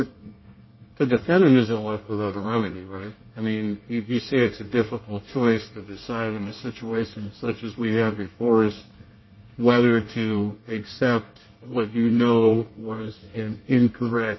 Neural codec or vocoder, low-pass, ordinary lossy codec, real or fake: codec, 24 kHz, 1 kbps, SNAC; 7.2 kHz; MP3, 24 kbps; fake